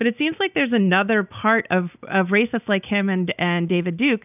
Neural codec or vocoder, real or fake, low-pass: none; real; 3.6 kHz